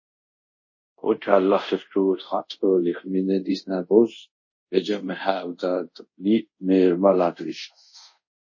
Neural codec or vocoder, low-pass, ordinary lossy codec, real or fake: codec, 24 kHz, 0.5 kbps, DualCodec; 7.2 kHz; MP3, 32 kbps; fake